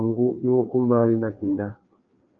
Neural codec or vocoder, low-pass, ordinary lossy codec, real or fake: codec, 16 kHz, 2 kbps, FreqCodec, larger model; 7.2 kHz; Opus, 24 kbps; fake